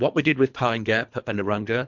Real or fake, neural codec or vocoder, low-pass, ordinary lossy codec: fake; codec, 24 kHz, 3 kbps, HILCodec; 7.2 kHz; MP3, 64 kbps